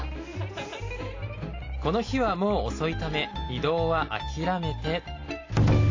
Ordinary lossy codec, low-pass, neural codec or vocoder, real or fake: AAC, 32 kbps; 7.2 kHz; none; real